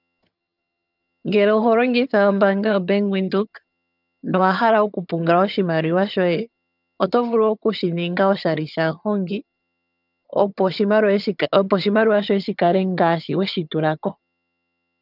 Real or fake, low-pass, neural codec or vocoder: fake; 5.4 kHz; vocoder, 22.05 kHz, 80 mel bands, HiFi-GAN